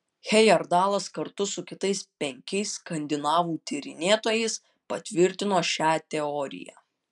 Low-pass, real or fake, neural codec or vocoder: 10.8 kHz; real; none